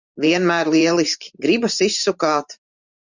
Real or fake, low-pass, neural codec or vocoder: fake; 7.2 kHz; vocoder, 44.1 kHz, 128 mel bands every 512 samples, BigVGAN v2